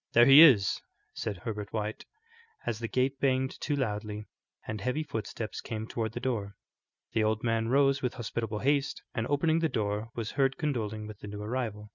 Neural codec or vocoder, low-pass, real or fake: none; 7.2 kHz; real